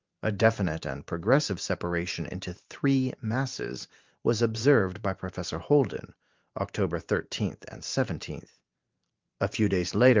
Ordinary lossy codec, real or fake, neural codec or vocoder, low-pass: Opus, 24 kbps; fake; vocoder, 44.1 kHz, 128 mel bands every 512 samples, BigVGAN v2; 7.2 kHz